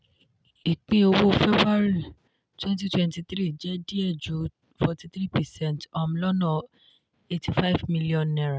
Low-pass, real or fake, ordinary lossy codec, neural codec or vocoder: none; real; none; none